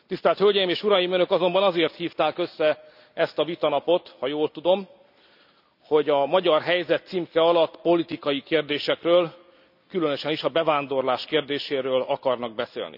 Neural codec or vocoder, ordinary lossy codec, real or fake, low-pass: none; none; real; 5.4 kHz